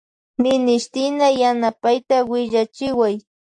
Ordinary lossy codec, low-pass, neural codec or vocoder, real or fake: AAC, 64 kbps; 10.8 kHz; none; real